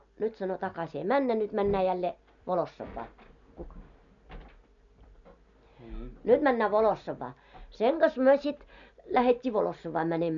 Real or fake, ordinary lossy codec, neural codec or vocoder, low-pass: real; none; none; 7.2 kHz